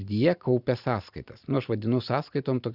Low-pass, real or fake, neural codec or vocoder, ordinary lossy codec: 5.4 kHz; fake; vocoder, 44.1 kHz, 128 mel bands every 512 samples, BigVGAN v2; Opus, 64 kbps